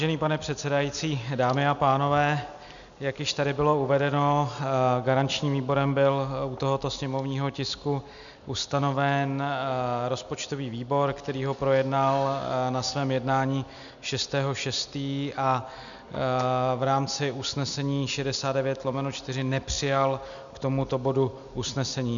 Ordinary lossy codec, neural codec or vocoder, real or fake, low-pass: MP3, 96 kbps; none; real; 7.2 kHz